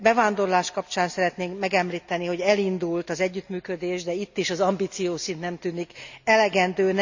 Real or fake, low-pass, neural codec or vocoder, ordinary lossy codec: real; 7.2 kHz; none; none